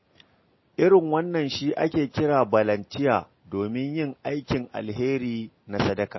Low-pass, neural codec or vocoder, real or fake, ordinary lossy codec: 7.2 kHz; none; real; MP3, 24 kbps